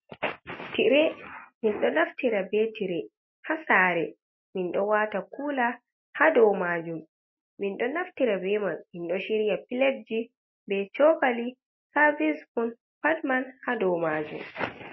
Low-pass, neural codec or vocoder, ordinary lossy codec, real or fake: 7.2 kHz; none; MP3, 24 kbps; real